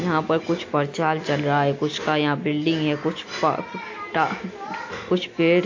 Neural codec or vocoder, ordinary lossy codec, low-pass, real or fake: none; AAC, 48 kbps; 7.2 kHz; real